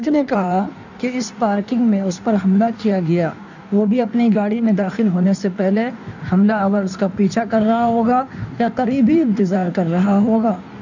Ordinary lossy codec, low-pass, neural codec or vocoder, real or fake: none; 7.2 kHz; codec, 16 kHz in and 24 kHz out, 1.1 kbps, FireRedTTS-2 codec; fake